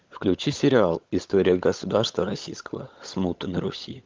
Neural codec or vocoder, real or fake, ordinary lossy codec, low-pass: codec, 16 kHz, 16 kbps, FunCodec, trained on LibriTTS, 50 frames a second; fake; Opus, 32 kbps; 7.2 kHz